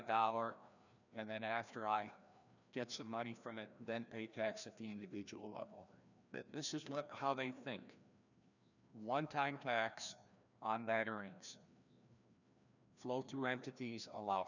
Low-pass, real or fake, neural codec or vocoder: 7.2 kHz; fake; codec, 16 kHz, 1 kbps, FreqCodec, larger model